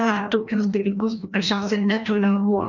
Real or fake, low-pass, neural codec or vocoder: fake; 7.2 kHz; codec, 16 kHz, 1 kbps, FreqCodec, larger model